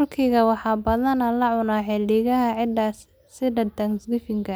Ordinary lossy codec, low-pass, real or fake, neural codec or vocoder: none; none; real; none